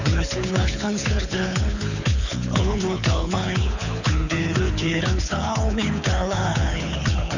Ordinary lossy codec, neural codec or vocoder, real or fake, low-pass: none; codec, 24 kHz, 6 kbps, HILCodec; fake; 7.2 kHz